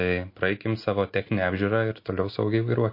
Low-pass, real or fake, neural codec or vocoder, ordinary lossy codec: 5.4 kHz; real; none; MP3, 32 kbps